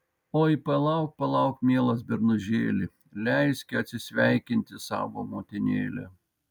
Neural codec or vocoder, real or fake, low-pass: none; real; 19.8 kHz